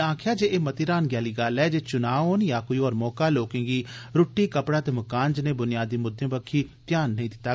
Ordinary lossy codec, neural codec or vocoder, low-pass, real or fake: none; none; none; real